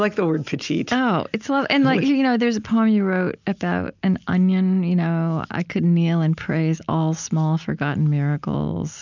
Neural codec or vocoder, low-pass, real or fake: none; 7.2 kHz; real